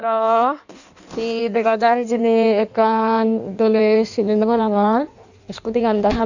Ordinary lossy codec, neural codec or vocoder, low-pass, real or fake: none; codec, 16 kHz in and 24 kHz out, 1.1 kbps, FireRedTTS-2 codec; 7.2 kHz; fake